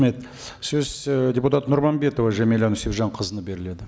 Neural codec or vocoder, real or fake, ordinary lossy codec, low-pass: none; real; none; none